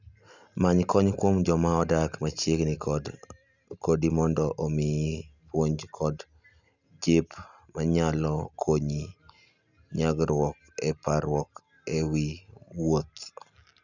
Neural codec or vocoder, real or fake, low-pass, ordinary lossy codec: none; real; 7.2 kHz; none